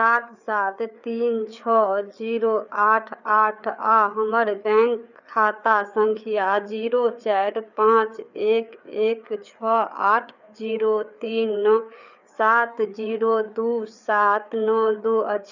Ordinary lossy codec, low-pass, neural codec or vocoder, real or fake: none; 7.2 kHz; codec, 16 kHz, 4 kbps, FreqCodec, larger model; fake